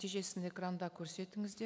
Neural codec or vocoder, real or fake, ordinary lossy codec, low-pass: none; real; none; none